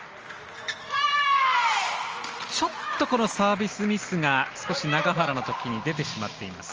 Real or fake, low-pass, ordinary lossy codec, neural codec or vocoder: real; 7.2 kHz; Opus, 24 kbps; none